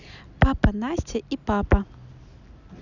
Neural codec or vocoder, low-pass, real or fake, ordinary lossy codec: none; 7.2 kHz; real; none